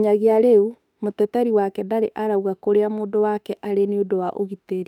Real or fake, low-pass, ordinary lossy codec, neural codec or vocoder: fake; 19.8 kHz; none; autoencoder, 48 kHz, 32 numbers a frame, DAC-VAE, trained on Japanese speech